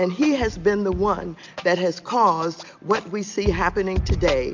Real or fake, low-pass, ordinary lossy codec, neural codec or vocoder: real; 7.2 kHz; MP3, 64 kbps; none